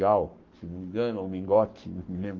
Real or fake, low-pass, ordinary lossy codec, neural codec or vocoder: fake; 7.2 kHz; Opus, 24 kbps; codec, 44.1 kHz, 7.8 kbps, Pupu-Codec